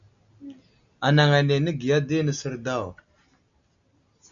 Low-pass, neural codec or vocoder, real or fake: 7.2 kHz; none; real